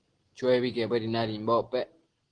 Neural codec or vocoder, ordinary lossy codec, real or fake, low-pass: none; Opus, 16 kbps; real; 9.9 kHz